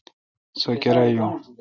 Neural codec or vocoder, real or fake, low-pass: none; real; 7.2 kHz